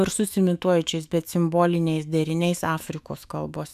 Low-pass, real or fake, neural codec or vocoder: 14.4 kHz; fake; autoencoder, 48 kHz, 128 numbers a frame, DAC-VAE, trained on Japanese speech